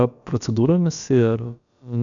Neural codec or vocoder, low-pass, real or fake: codec, 16 kHz, about 1 kbps, DyCAST, with the encoder's durations; 7.2 kHz; fake